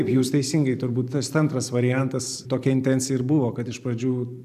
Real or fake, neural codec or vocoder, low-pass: fake; vocoder, 44.1 kHz, 128 mel bands every 512 samples, BigVGAN v2; 14.4 kHz